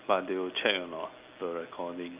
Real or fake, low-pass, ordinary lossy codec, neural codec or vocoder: real; 3.6 kHz; Opus, 24 kbps; none